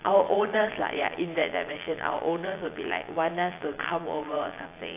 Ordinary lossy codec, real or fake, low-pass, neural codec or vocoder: AAC, 24 kbps; fake; 3.6 kHz; vocoder, 22.05 kHz, 80 mel bands, Vocos